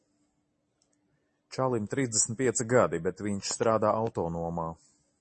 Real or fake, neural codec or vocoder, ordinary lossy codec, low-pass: real; none; MP3, 32 kbps; 10.8 kHz